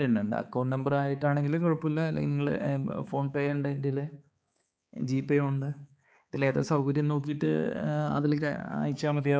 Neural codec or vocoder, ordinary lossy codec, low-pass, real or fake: codec, 16 kHz, 4 kbps, X-Codec, HuBERT features, trained on balanced general audio; none; none; fake